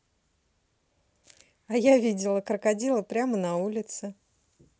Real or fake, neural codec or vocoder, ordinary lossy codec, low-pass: real; none; none; none